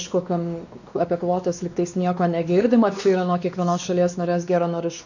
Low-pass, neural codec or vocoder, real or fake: 7.2 kHz; codec, 16 kHz, 2 kbps, X-Codec, WavLM features, trained on Multilingual LibriSpeech; fake